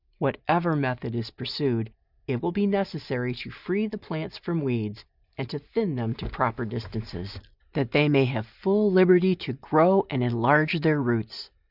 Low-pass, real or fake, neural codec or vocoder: 5.4 kHz; real; none